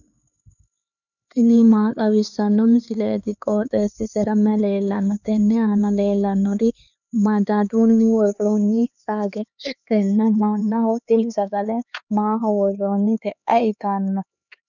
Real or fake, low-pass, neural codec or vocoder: fake; 7.2 kHz; codec, 16 kHz, 4 kbps, X-Codec, HuBERT features, trained on LibriSpeech